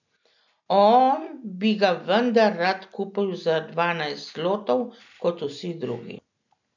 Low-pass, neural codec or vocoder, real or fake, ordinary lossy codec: 7.2 kHz; none; real; none